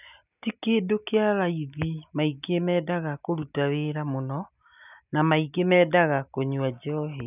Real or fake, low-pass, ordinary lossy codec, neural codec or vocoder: real; 3.6 kHz; none; none